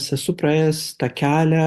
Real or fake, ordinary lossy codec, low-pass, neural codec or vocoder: real; Opus, 64 kbps; 14.4 kHz; none